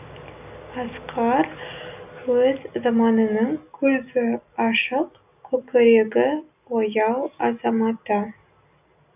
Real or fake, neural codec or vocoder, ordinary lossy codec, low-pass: real; none; none; 3.6 kHz